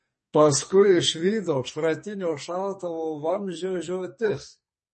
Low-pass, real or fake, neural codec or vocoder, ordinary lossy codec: 10.8 kHz; fake; codec, 44.1 kHz, 2.6 kbps, SNAC; MP3, 32 kbps